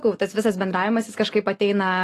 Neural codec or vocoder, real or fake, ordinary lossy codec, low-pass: none; real; AAC, 48 kbps; 14.4 kHz